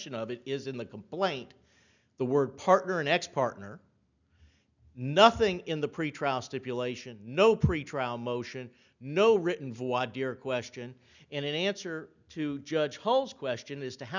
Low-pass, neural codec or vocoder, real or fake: 7.2 kHz; none; real